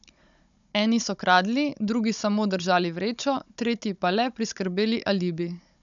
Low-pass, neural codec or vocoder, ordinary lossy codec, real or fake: 7.2 kHz; codec, 16 kHz, 16 kbps, FunCodec, trained on Chinese and English, 50 frames a second; none; fake